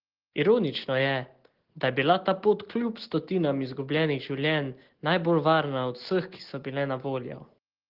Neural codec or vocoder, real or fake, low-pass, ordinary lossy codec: none; real; 5.4 kHz; Opus, 16 kbps